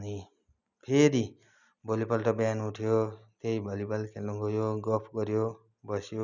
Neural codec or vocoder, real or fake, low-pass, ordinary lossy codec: none; real; 7.2 kHz; none